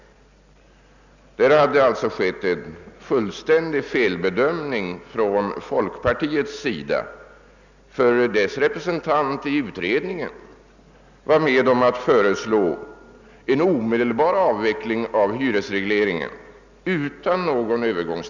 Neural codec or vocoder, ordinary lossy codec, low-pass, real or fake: none; none; 7.2 kHz; real